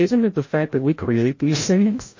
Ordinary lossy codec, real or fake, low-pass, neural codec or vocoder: MP3, 32 kbps; fake; 7.2 kHz; codec, 16 kHz, 0.5 kbps, FreqCodec, larger model